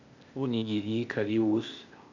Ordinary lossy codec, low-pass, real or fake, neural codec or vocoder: none; 7.2 kHz; fake; codec, 16 kHz, 0.8 kbps, ZipCodec